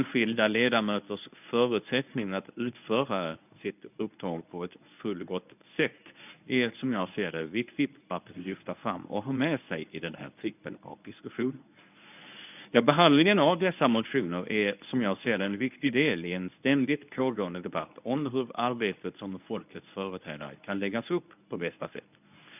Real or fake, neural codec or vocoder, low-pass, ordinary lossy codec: fake; codec, 24 kHz, 0.9 kbps, WavTokenizer, medium speech release version 1; 3.6 kHz; none